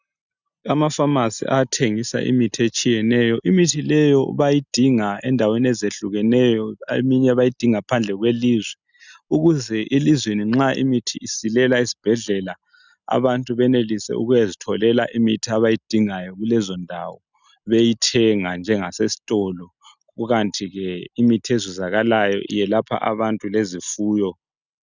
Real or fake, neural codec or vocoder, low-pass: real; none; 7.2 kHz